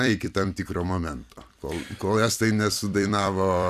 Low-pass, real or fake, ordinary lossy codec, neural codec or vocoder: 14.4 kHz; fake; MP3, 96 kbps; vocoder, 44.1 kHz, 128 mel bands every 256 samples, BigVGAN v2